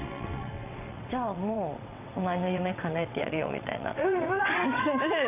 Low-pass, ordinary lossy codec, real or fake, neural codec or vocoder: 3.6 kHz; none; fake; vocoder, 22.05 kHz, 80 mel bands, WaveNeXt